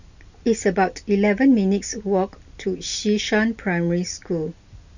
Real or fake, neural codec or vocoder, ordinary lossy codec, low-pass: real; none; none; 7.2 kHz